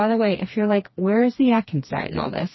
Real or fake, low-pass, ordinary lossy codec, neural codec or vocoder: fake; 7.2 kHz; MP3, 24 kbps; codec, 16 kHz, 2 kbps, FreqCodec, smaller model